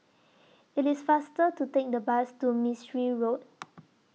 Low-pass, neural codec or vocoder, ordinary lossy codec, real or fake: none; none; none; real